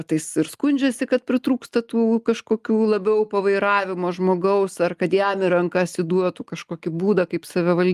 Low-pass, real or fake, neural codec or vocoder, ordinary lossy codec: 14.4 kHz; real; none; Opus, 32 kbps